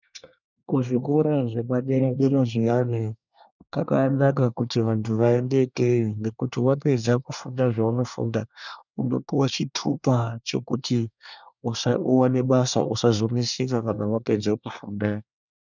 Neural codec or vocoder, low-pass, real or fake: codec, 24 kHz, 1 kbps, SNAC; 7.2 kHz; fake